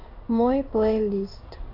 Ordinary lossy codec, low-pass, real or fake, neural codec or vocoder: AAC, 48 kbps; 5.4 kHz; fake; codec, 16 kHz in and 24 kHz out, 1 kbps, XY-Tokenizer